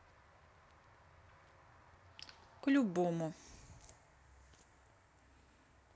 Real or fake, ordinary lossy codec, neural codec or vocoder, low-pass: real; none; none; none